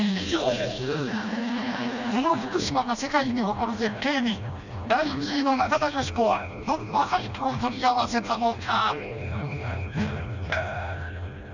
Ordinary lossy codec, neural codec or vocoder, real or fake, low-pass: none; codec, 16 kHz, 1 kbps, FreqCodec, smaller model; fake; 7.2 kHz